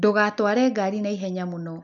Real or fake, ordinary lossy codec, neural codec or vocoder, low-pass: real; none; none; 7.2 kHz